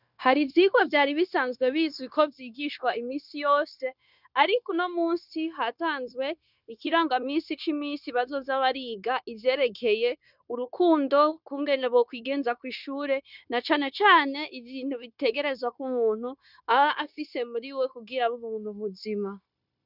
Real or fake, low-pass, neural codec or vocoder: fake; 5.4 kHz; codec, 16 kHz, 0.9 kbps, LongCat-Audio-Codec